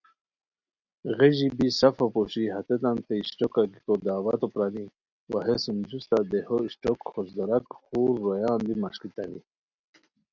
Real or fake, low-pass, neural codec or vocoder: real; 7.2 kHz; none